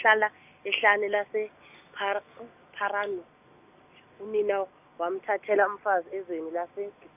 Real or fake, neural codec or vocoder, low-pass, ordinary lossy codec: real; none; 3.6 kHz; none